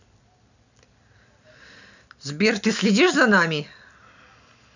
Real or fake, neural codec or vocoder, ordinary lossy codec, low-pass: real; none; none; 7.2 kHz